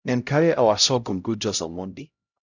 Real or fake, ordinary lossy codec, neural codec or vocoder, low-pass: fake; none; codec, 16 kHz, 0.5 kbps, X-Codec, HuBERT features, trained on LibriSpeech; 7.2 kHz